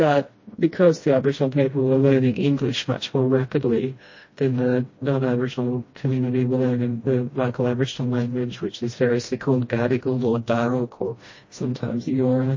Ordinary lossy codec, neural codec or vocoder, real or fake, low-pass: MP3, 32 kbps; codec, 16 kHz, 1 kbps, FreqCodec, smaller model; fake; 7.2 kHz